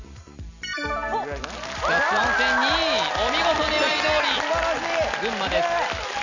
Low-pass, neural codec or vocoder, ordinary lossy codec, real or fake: 7.2 kHz; none; none; real